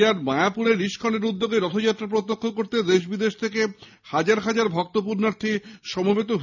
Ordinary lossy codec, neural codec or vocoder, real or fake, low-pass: none; none; real; 7.2 kHz